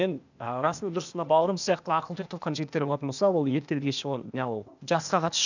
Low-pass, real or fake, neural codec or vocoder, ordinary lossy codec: 7.2 kHz; fake; codec, 16 kHz, 0.8 kbps, ZipCodec; none